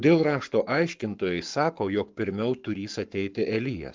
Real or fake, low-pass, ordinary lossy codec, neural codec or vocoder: fake; 7.2 kHz; Opus, 24 kbps; codec, 44.1 kHz, 7.8 kbps, Pupu-Codec